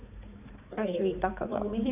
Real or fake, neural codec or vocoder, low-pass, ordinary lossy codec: fake; codec, 16 kHz, 4 kbps, X-Codec, HuBERT features, trained on balanced general audio; 3.6 kHz; none